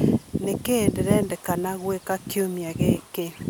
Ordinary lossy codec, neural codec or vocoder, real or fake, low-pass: none; none; real; none